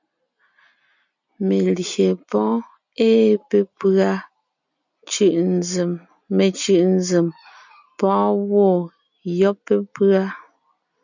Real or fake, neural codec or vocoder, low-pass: real; none; 7.2 kHz